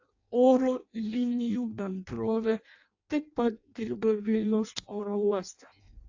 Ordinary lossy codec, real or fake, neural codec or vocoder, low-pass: AAC, 48 kbps; fake; codec, 16 kHz in and 24 kHz out, 0.6 kbps, FireRedTTS-2 codec; 7.2 kHz